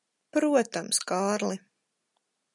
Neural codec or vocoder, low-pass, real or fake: none; 10.8 kHz; real